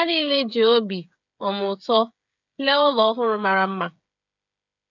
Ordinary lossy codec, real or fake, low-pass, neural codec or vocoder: none; fake; 7.2 kHz; codec, 16 kHz, 8 kbps, FreqCodec, smaller model